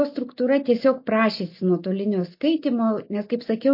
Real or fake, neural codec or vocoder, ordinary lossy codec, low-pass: real; none; MP3, 32 kbps; 5.4 kHz